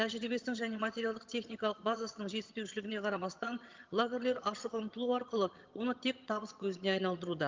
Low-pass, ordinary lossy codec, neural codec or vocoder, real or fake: 7.2 kHz; Opus, 32 kbps; vocoder, 22.05 kHz, 80 mel bands, HiFi-GAN; fake